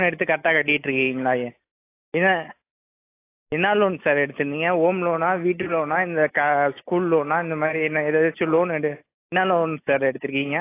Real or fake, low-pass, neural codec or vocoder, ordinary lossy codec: real; 3.6 kHz; none; AAC, 24 kbps